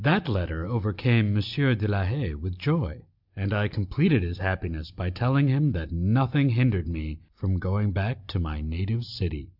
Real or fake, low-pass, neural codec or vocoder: real; 5.4 kHz; none